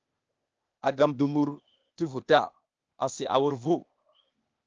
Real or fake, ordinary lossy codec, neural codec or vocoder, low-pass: fake; Opus, 24 kbps; codec, 16 kHz, 0.8 kbps, ZipCodec; 7.2 kHz